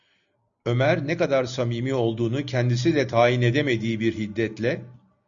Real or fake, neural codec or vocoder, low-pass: real; none; 7.2 kHz